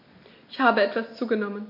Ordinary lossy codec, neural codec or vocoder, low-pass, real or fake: MP3, 32 kbps; none; 5.4 kHz; real